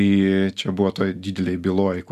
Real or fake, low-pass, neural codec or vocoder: real; 14.4 kHz; none